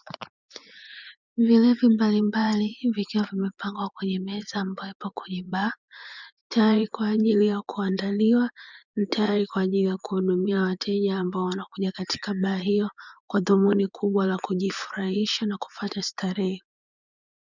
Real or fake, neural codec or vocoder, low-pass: fake; vocoder, 24 kHz, 100 mel bands, Vocos; 7.2 kHz